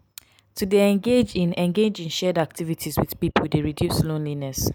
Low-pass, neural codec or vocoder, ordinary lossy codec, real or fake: none; none; none; real